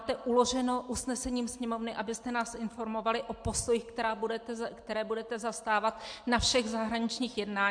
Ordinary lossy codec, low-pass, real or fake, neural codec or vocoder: MP3, 64 kbps; 9.9 kHz; real; none